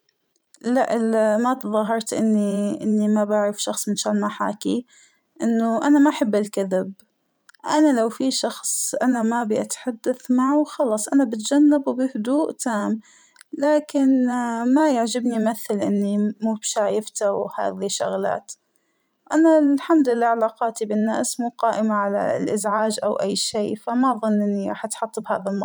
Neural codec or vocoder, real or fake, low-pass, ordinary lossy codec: vocoder, 44.1 kHz, 128 mel bands every 512 samples, BigVGAN v2; fake; none; none